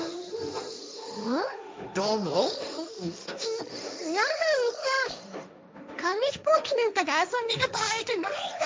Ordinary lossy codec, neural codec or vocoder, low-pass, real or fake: none; codec, 16 kHz, 1.1 kbps, Voila-Tokenizer; none; fake